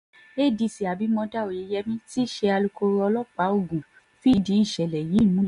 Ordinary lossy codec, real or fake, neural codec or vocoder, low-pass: MP3, 64 kbps; real; none; 10.8 kHz